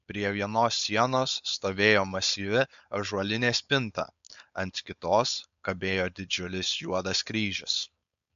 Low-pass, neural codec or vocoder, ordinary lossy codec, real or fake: 7.2 kHz; codec, 16 kHz, 4.8 kbps, FACodec; MP3, 64 kbps; fake